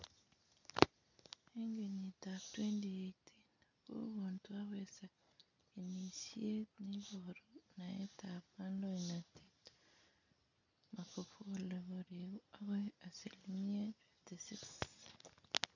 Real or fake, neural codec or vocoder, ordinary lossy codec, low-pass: real; none; none; 7.2 kHz